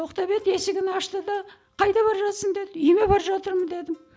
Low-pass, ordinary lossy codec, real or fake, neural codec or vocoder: none; none; real; none